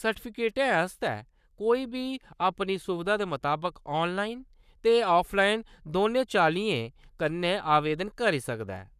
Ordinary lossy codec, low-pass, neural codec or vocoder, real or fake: none; 14.4 kHz; codec, 44.1 kHz, 7.8 kbps, Pupu-Codec; fake